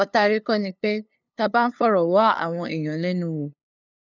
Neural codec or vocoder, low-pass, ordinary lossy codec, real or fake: codec, 16 kHz, 2 kbps, FunCodec, trained on LibriTTS, 25 frames a second; 7.2 kHz; none; fake